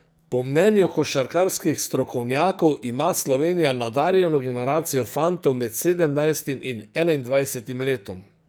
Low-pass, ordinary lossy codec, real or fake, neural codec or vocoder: none; none; fake; codec, 44.1 kHz, 2.6 kbps, SNAC